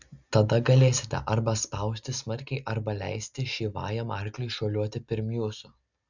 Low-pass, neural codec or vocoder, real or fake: 7.2 kHz; none; real